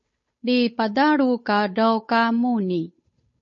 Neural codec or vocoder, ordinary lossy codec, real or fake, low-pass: codec, 16 kHz, 8 kbps, FunCodec, trained on Chinese and English, 25 frames a second; MP3, 32 kbps; fake; 7.2 kHz